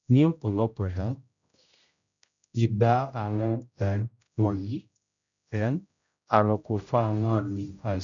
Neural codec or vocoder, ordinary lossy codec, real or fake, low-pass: codec, 16 kHz, 0.5 kbps, X-Codec, HuBERT features, trained on general audio; none; fake; 7.2 kHz